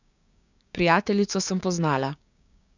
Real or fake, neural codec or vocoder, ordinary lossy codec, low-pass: fake; codec, 16 kHz, 6 kbps, DAC; none; 7.2 kHz